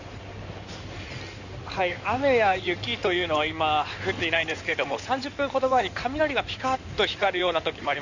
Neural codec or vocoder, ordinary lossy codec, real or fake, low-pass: codec, 16 kHz in and 24 kHz out, 2.2 kbps, FireRedTTS-2 codec; none; fake; 7.2 kHz